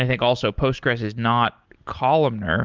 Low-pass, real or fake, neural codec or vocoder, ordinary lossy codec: 7.2 kHz; real; none; Opus, 32 kbps